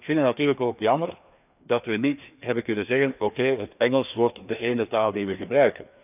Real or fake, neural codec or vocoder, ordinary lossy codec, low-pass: fake; codec, 16 kHz, 2 kbps, FreqCodec, larger model; none; 3.6 kHz